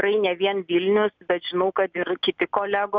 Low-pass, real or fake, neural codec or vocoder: 7.2 kHz; real; none